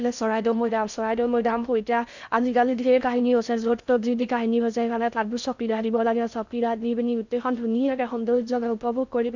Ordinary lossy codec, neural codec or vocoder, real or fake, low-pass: none; codec, 16 kHz in and 24 kHz out, 0.6 kbps, FocalCodec, streaming, 2048 codes; fake; 7.2 kHz